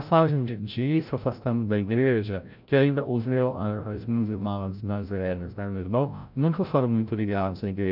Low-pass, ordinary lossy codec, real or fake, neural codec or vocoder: 5.4 kHz; none; fake; codec, 16 kHz, 0.5 kbps, FreqCodec, larger model